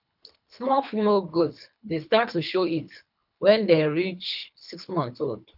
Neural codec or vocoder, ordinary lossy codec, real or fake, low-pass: codec, 24 kHz, 3 kbps, HILCodec; none; fake; 5.4 kHz